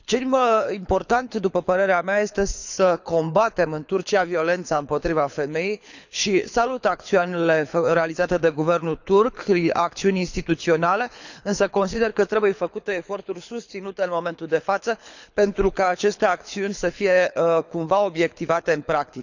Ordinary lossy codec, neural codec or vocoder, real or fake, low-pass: none; codec, 24 kHz, 6 kbps, HILCodec; fake; 7.2 kHz